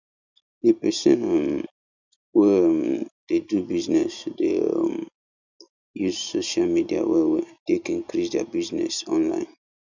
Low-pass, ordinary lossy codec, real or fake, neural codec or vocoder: 7.2 kHz; none; real; none